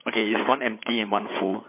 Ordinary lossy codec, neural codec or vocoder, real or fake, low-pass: MP3, 24 kbps; codec, 16 kHz, 8 kbps, FreqCodec, larger model; fake; 3.6 kHz